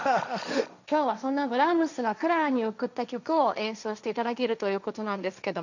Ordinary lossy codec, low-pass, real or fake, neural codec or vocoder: none; 7.2 kHz; fake; codec, 16 kHz, 1.1 kbps, Voila-Tokenizer